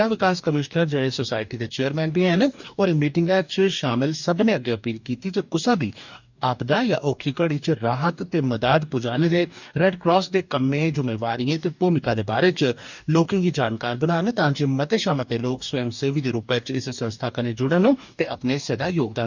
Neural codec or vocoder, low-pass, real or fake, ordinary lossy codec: codec, 44.1 kHz, 2.6 kbps, DAC; 7.2 kHz; fake; none